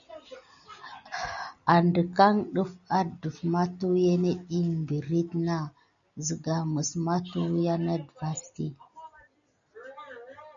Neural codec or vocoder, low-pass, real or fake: none; 7.2 kHz; real